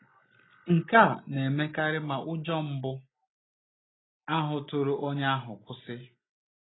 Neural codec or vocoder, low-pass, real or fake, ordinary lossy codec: none; 7.2 kHz; real; AAC, 16 kbps